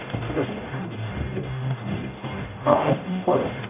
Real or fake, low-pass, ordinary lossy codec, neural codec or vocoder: fake; 3.6 kHz; none; codec, 44.1 kHz, 0.9 kbps, DAC